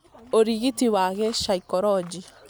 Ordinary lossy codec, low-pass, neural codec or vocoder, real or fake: none; none; none; real